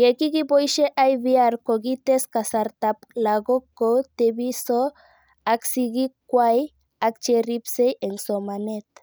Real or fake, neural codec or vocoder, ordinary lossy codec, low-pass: real; none; none; none